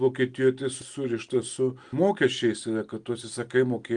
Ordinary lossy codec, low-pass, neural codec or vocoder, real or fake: Opus, 32 kbps; 9.9 kHz; none; real